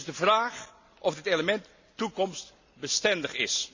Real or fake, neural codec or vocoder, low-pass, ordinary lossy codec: real; none; 7.2 kHz; Opus, 64 kbps